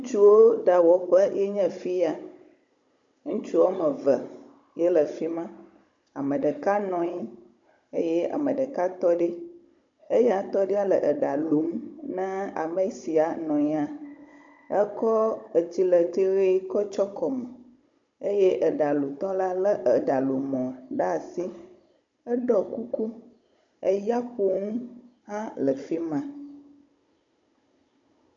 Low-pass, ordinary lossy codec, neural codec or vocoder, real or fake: 7.2 kHz; MP3, 48 kbps; codec, 16 kHz, 16 kbps, FunCodec, trained on Chinese and English, 50 frames a second; fake